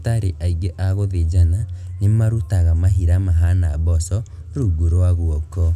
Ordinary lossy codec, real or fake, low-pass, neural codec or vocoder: none; real; 14.4 kHz; none